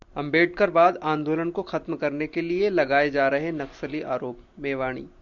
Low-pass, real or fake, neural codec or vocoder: 7.2 kHz; real; none